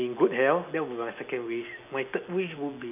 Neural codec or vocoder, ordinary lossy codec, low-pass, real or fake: none; none; 3.6 kHz; real